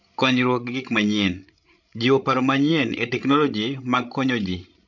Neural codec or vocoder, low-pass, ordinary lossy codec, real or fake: codec, 16 kHz, 16 kbps, FreqCodec, larger model; 7.2 kHz; none; fake